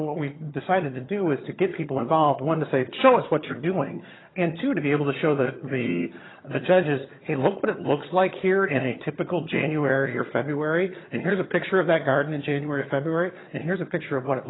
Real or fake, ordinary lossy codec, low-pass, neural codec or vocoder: fake; AAC, 16 kbps; 7.2 kHz; vocoder, 22.05 kHz, 80 mel bands, HiFi-GAN